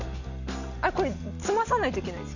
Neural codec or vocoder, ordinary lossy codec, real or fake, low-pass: none; none; real; 7.2 kHz